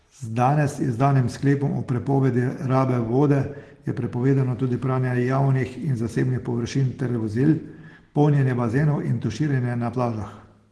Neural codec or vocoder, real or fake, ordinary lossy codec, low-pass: none; real; Opus, 16 kbps; 10.8 kHz